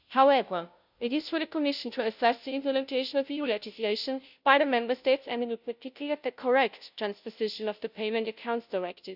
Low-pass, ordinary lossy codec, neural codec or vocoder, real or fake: 5.4 kHz; none; codec, 16 kHz, 0.5 kbps, FunCodec, trained on Chinese and English, 25 frames a second; fake